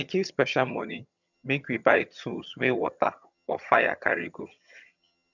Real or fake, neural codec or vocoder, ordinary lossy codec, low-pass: fake; vocoder, 22.05 kHz, 80 mel bands, HiFi-GAN; none; 7.2 kHz